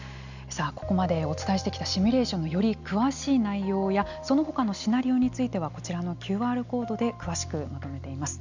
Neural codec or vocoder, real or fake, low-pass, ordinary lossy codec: none; real; 7.2 kHz; none